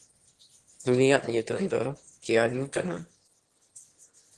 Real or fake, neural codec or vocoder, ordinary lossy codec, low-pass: fake; autoencoder, 22.05 kHz, a latent of 192 numbers a frame, VITS, trained on one speaker; Opus, 16 kbps; 9.9 kHz